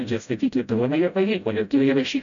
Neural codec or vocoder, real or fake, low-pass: codec, 16 kHz, 0.5 kbps, FreqCodec, smaller model; fake; 7.2 kHz